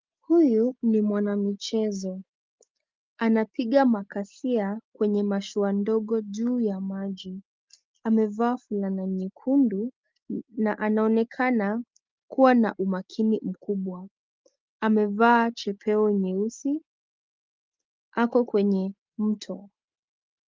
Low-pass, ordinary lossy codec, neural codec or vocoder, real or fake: 7.2 kHz; Opus, 32 kbps; none; real